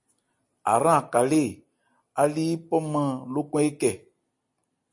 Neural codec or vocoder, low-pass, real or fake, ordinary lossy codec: none; 10.8 kHz; real; MP3, 48 kbps